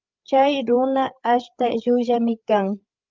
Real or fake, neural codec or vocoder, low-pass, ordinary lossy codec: fake; codec, 16 kHz, 8 kbps, FreqCodec, larger model; 7.2 kHz; Opus, 32 kbps